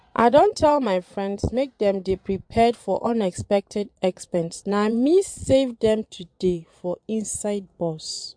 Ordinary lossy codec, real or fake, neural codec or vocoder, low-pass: MP3, 64 kbps; fake; vocoder, 22.05 kHz, 80 mel bands, Vocos; 9.9 kHz